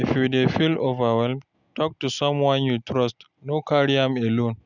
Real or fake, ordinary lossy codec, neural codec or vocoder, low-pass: real; none; none; 7.2 kHz